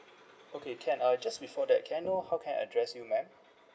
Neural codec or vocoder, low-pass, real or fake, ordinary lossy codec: none; none; real; none